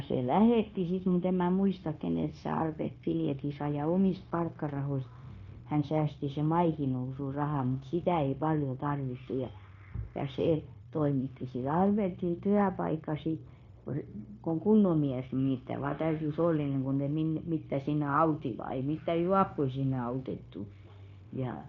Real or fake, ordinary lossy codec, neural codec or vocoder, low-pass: fake; AAC, 48 kbps; codec, 16 kHz, 0.9 kbps, LongCat-Audio-Codec; 7.2 kHz